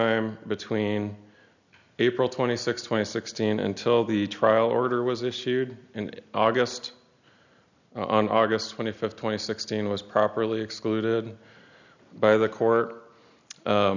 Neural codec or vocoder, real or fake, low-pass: none; real; 7.2 kHz